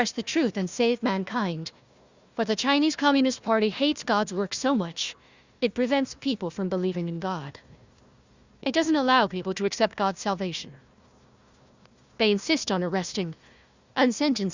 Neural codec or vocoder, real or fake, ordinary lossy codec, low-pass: codec, 16 kHz, 1 kbps, FunCodec, trained on Chinese and English, 50 frames a second; fake; Opus, 64 kbps; 7.2 kHz